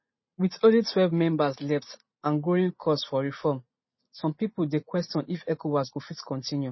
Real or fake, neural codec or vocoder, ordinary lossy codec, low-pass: real; none; MP3, 24 kbps; 7.2 kHz